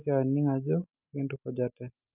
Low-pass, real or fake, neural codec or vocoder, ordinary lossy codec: 3.6 kHz; real; none; none